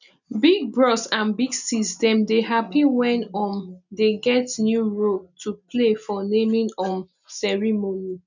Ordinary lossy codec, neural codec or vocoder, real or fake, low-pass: none; none; real; 7.2 kHz